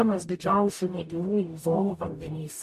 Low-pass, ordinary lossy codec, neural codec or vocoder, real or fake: 14.4 kHz; MP3, 64 kbps; codec, 44.1 kHz, 0.9 kbps, DAC; fake